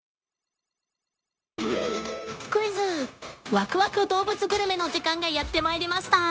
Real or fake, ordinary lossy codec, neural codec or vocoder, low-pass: fake; none; codec, 16 kHz, 0.9 kbps, LongCat-Audio-Codec; none